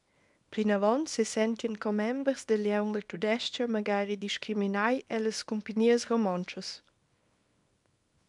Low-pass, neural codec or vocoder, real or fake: 10.8 kHz; codec, 24 kHz, 0.9 kbps, WavTokenizer, small release; fake